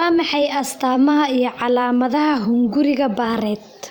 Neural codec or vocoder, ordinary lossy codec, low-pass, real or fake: vocoder, 44.1 kHz, 128 mel bands every 512 samples, BigVGAN v2; none; 19.8 kHz; fake